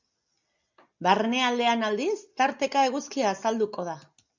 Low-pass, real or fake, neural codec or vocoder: 7.2 kHz; real; none